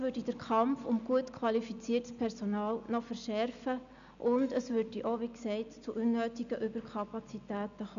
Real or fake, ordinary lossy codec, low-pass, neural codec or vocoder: real; none; 7.2 kHz; none